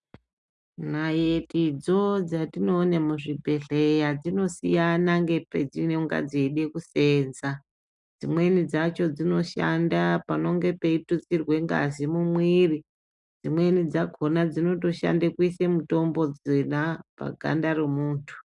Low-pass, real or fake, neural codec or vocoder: 10.8 kHz; real; none